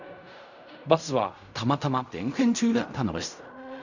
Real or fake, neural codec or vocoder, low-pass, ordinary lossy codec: fake; codec, 16 kHz in and 24 kHz out, 0.4 kbps, LongCat-Audio-Codec, fine tuned four codebook decoder; 7.2 kHz; none